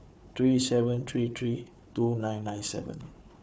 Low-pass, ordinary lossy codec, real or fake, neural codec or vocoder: none; none; fake; codec, 16 kHz, 4 kbps, FunCodec, trained on Chinese and English, 50 frames a second